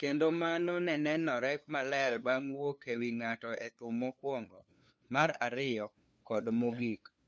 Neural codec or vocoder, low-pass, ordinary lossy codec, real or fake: codec, 16 kHz, 2 kbps, FunCodec, trained on LibriTTS, 25 frames a second; none; none; fake